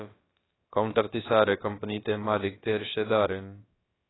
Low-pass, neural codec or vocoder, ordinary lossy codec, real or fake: 7.2 kHz; codec, 16 kHz, about 1 kbps, DyCAST, with the encoder's durations; AAC, 16 kbps; fake